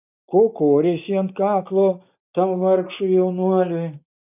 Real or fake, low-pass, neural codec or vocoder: real; 3.6 kHz; none